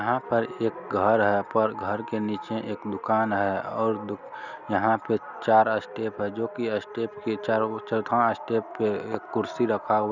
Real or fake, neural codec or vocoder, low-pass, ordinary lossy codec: real; none; 7.2 kHz; none